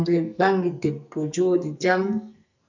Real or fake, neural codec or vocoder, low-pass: fake; codec, 44.1 kHz, 2.6 kbps, SNAC; 7.2 kHz